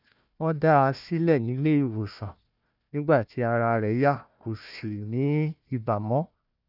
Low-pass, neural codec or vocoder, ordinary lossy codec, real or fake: 5.4 kHz; codec, 16 kHz, 1 kbps, FunCodec, trained on Chinese and English, 50 frames a second; none; fake